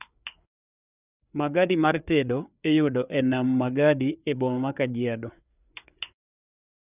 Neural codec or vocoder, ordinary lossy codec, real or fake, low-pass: codec, 16 kHz, 4 kbps, FreqCodec, larger model; none; fake; 3.6 kHz